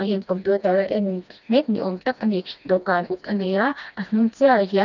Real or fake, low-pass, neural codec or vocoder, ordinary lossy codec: fake; 7.2 kHz; codec, 16 kHz, 1 kbps, FreqCodec, smaller model; none